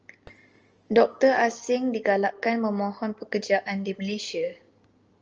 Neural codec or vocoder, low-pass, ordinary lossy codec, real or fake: none; 7.2 kHz; Opus, 24 kbps; real